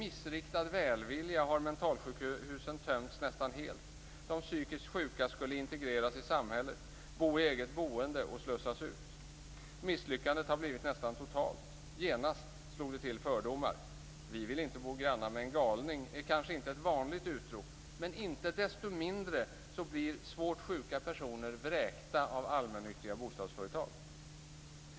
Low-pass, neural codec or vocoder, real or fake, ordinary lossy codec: none; none; real; none